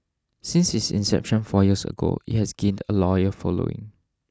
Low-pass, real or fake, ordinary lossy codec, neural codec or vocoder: none; real; none; none